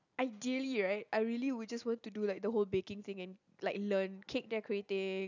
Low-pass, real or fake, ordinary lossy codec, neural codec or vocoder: 7.2 kHz; real; none; none